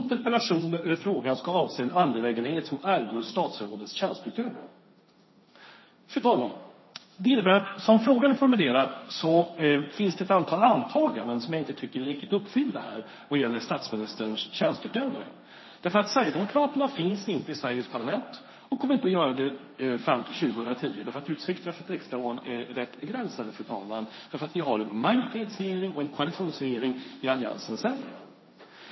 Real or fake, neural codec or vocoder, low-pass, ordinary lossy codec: fake; codec, 16 kHz, 1.1 kbps, Voila-Tokenizer; 7.2 kHz; MP3, 24 kbps